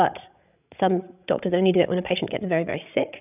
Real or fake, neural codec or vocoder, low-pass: fake; codec, 16 kHz, 16 kbps, FreqCodec, larger model; 3.6 kHz